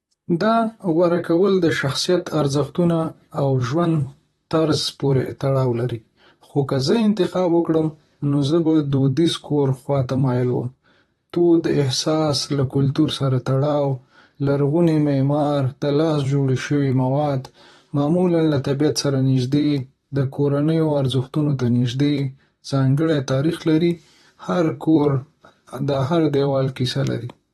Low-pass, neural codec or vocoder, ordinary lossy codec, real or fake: 19.8 kHz; vocoder, 44.1 kHz, 128 mel bands, Pupu-Vocoder; AAC, 32 kbps; fake